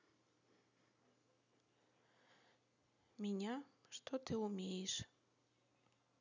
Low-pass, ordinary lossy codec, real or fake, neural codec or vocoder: 7.2 kHz; none; real; none